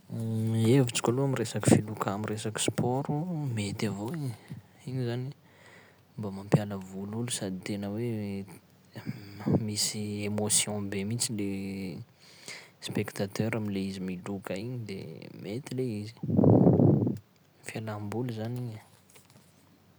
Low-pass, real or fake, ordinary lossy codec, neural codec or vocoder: none; real; none; none